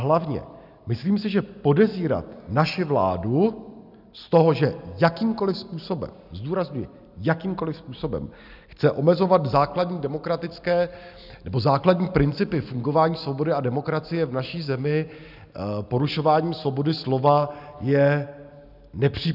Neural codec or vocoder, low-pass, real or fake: none; 5.4 kHz; real